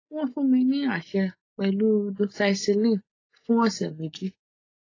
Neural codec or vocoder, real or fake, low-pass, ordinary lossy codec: none; real; 7.2 kHz; AAC, 32 kbps